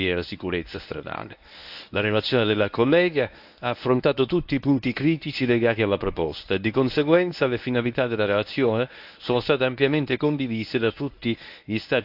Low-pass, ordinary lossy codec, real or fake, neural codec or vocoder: 5.4 kHz; AAC, 48 kbps; fake; codec, 24 kHz, 0.9 kbps, WavTokenizer, medium speech release version 1